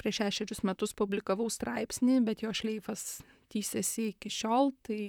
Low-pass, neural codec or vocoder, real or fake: 19.8 kHz; vocoder, 44.1 kHz, 128 mel bands, Pupu-Vocoder; fake